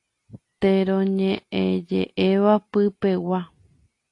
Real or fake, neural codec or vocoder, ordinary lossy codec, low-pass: real; none; AAC, 48 kbps; 10.8 kHz